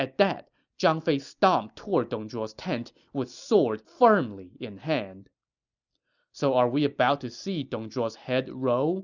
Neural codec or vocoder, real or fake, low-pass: none; real; 7.2 kHz